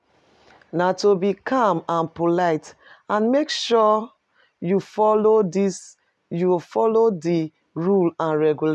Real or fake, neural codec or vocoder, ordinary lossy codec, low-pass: real; none; none; none